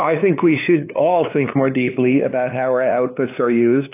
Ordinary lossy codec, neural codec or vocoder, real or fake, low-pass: AAC, 24 kbps; codec, 16 kHz, 2 kbps, FunCodec, trained on LibriTTS, 25 frames a second; fake; 3.6 kHz